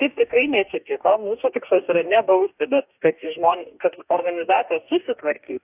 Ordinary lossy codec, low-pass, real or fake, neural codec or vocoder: AAC, 32 kbps; 3.6 kHz; fake; codec, 44.1 kHz, 2.6 kbps, DAC